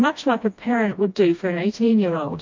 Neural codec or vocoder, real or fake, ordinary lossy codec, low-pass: codec, 16 kHz, 1 kbps, FreqCodec, smaller model; fake; MP3, 48 kbps; 7.2 kHz